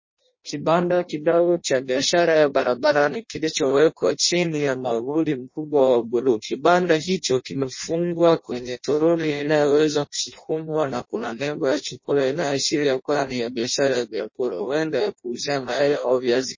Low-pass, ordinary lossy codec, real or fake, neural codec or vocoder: 7.2 kHz; MP3, 32 kbps; fake; codec, 16 kHz in and 24 kHz out, 0.6 kbps, FireRedTTS-2 codec